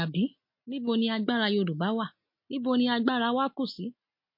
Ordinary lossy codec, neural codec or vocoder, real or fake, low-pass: MP3, 32 kbps; none; real; 5.4 kHz